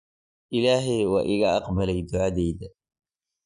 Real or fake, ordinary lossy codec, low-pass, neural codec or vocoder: real; none; 10.8 kHz; none